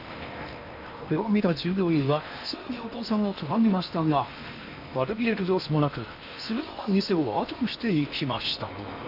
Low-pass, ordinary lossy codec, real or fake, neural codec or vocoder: 5.4 kHz; none; fake; codec, 16 kHz in and 24 kHz out, 0.8 kbps, FocalCodec, streaming, 65536 codes